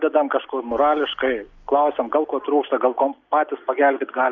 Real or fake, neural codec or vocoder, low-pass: real; none; 7.2 kHz